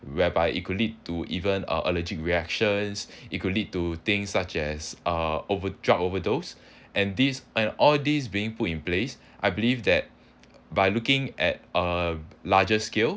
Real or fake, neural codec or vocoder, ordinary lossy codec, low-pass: real; none; none; none